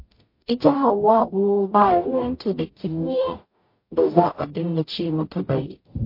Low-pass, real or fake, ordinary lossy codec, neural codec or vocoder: 5.4 kHz; fake; MP3, 32 kbps; codec, 44.1 kHz, 0.9 kbps, DAC